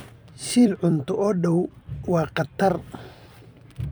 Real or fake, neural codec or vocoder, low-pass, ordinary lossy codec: fake; vocoder, 44.1 kHz, 128 mel bands every 256 samples, BigVGAN v2; none; none